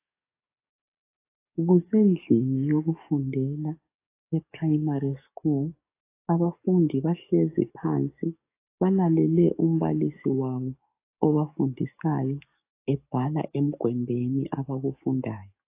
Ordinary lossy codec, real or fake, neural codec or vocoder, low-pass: AAC, 24 kbps; fake; codec, 44.1 kHz, 7.8 kbps, DAC; 3.6 kHz